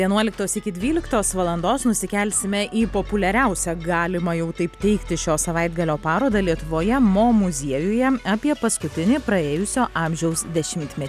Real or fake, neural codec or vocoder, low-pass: real; none; 14.4 kHz